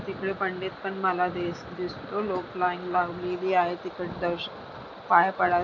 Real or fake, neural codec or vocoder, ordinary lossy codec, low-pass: real; none; none; 7.2 kHz